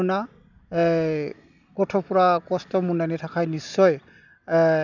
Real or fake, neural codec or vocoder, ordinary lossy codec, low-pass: real; none; none; 7.2 kHz